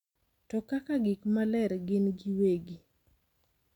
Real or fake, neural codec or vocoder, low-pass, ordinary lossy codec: real; none; 19.8 kHz; none